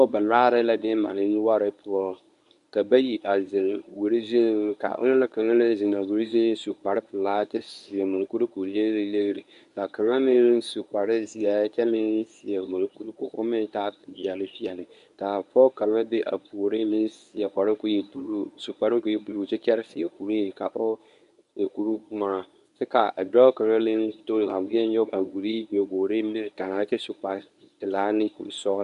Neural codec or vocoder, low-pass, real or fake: codec, 24 kHz, 0.9 kbps, WavTokenizer, medium speech release version 1; 10.8 kHz; fake